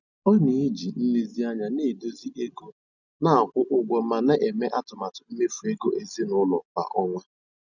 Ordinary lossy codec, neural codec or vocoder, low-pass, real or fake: none; none; 7.2 kHz; real